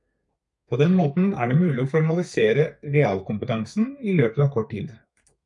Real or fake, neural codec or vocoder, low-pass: fake; codec, 32 kHz, 1.9 kbps, SNAC; 10.8 kHz